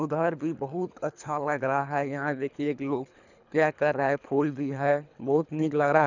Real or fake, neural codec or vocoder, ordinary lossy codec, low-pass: fake; codec, 24 kHz, 3 kbps, HILCodec; none; 7.2 kHz